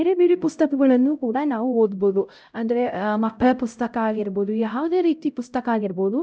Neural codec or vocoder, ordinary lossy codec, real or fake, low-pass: codec, 16 kHz, 0.5 kbps, X-Codec, HuBERT features, trained on LibriSpeech; none; fake; none